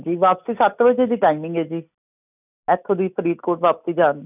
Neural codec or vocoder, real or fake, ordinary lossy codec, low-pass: none; real; none; 3.6 kHz